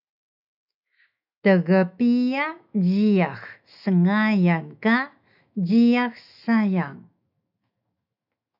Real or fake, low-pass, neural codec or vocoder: fake; 5.4 kHz; autoencoder, 48 kHz, 128 numbers a frame, DAC-VAE, trained on Japanese speech